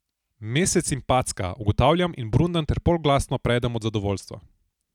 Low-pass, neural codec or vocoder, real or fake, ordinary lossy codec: 19.8 kHz; none; real; none